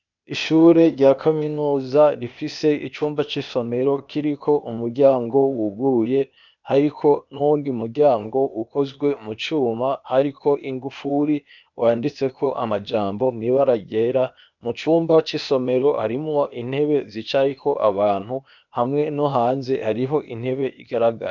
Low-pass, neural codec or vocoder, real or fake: 7.2 kHz; codec, 16 kHz, 0.8 kbps, ZipCodec; fake